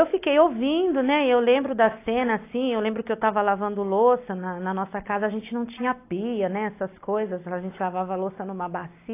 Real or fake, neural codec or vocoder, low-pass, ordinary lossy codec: real; none; 3.6 kHz; AAC, 24 kbps